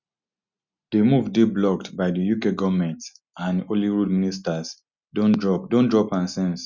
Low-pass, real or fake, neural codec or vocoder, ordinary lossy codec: 7.2 kHz; real; none; Opus, 64 kbps